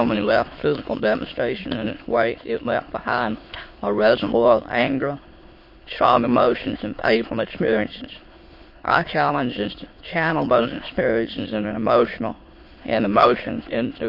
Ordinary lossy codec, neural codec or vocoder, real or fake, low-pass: MP3, 32 kbps; autoencoder, 22.05 kHz, a latent of 192 numbers a frame, VITS, trained on many speakers; fake; 5.4 kHz